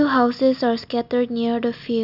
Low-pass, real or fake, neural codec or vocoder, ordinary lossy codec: 5.4 kHz; real; none; none